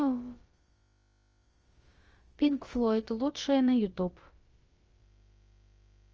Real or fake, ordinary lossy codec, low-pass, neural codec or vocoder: fake; Opus, 24 kbps; 7.2 kHz; codec, 16 kHz, about 1 kbps, DyCAST, with the encoder's durations